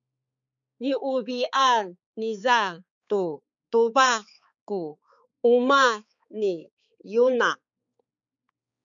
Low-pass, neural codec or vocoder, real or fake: 7.2 kHz; codec, 16 kHz, 4 kbps, X-Codec, HuBERT features, trained on balanced general audio; fake